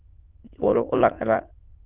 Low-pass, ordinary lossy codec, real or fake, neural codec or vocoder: 3.6 kHz; Opus, 16 kbps; fake; autoencoder, 22.05 kHz, a latent of 192 numbers a frame, VITS, trained on many speakers